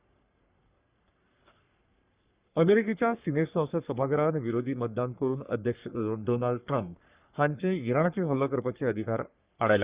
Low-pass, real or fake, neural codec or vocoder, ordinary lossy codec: 3.6 kHz; fake; codec, 44.1 kHz, 3.4 kbps, Pupu-Codec; Opus, 64 kbps